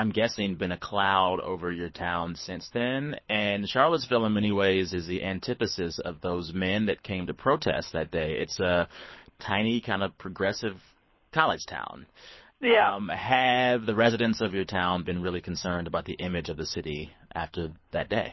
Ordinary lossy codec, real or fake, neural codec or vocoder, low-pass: MP3, 24 kbps; fake; codec, 24 kHz, 6 kbps, HILCodec; 7.2 kHz